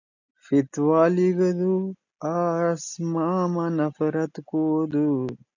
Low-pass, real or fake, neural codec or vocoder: 7.2 kHz; real; none